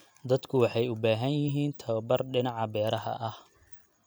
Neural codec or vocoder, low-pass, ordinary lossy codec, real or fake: none; none; none; real